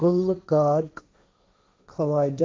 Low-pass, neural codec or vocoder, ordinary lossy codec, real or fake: none; codec, 16 kHz, 1.1 kbps, Voila-Tokenizer; none; fake